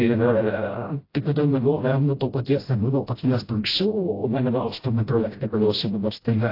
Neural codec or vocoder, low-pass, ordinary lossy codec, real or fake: codec, 16 kHz, 0.5 kbps, FreqCodec, smaller model; 5.4 kHz; AAC, 32 kbps; fake